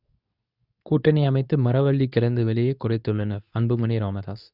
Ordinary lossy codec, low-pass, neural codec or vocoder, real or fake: none; 5.4 kHz; codec, 24 kHz, 0.9 kbps, WavTokenizer, medium speech release version 2; fake